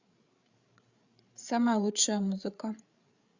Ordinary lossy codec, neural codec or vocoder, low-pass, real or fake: Opus, 64 kbps; codec, 16 kHz, 8 kbps, FreqCodec, larger model; 7.2 kHz; fake